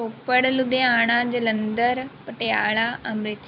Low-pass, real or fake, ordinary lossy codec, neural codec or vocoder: 5.4 kHz; fake; none; vocoder, 44.1 kHz, 128 mel bands every 256 samples, BigVGAN v2